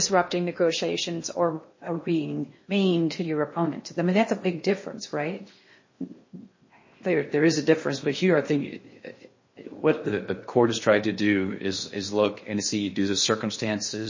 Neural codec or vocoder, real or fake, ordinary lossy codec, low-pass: codec, 16 kHz in and 24 kHz out, 0.6 kbps, FocalCodec, streaming, 2048 codes; fake; MP3, 32 kbps; 7.2 kHz